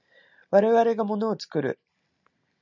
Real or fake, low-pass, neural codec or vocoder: real; 7.2 kHz; none